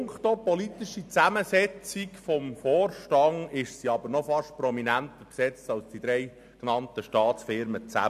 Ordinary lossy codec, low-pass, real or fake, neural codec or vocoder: MP3, 96 kbps; 14.4 kHz; real; none